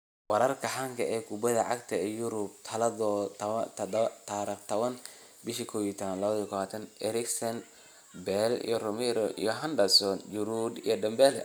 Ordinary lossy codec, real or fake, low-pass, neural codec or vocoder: none; real; none; none